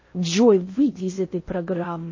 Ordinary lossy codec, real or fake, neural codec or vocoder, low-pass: MP3, 32 kbps; fake; codec, 16 kHz in and 24 kHz out, 0.6 kbps, FocalCodec, streaming, 4096 codes; 7.2 kHz